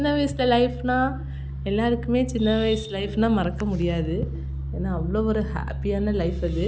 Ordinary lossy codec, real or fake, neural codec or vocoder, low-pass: none; real; none; none